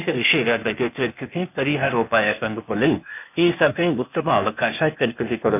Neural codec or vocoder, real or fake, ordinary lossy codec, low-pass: codec, 16 kHz, 0.8 kbps, ZipCodec; fake; none; 3.6 kHz